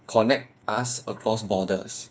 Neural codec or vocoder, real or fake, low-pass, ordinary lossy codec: codec, 16 kHz, 4 kbps, FreqCodec, smaller model; fake; none; none